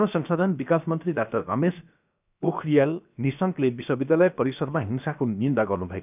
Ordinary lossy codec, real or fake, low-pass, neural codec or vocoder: none; fake; 3.6 kHz; codec, 16 kHz, 0.7 kbps, FocalCodec